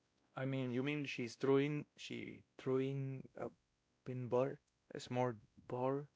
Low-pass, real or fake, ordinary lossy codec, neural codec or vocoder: none; fake; none; codec, 16 kHz, 1 kbps, X-Codec, WavLM features, trained on Multilingual LibriSpeech